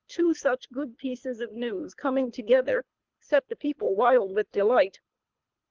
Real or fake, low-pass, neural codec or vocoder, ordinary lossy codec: fake; 7.2 kHz; codec, 24 kHz, 3 kbps, HILCodec; Opus, 32 kbps